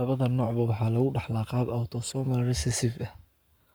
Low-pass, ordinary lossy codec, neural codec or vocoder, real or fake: none; none; codec, 44.1 kHz, 7.8 kbps, Pupu-Codec; fake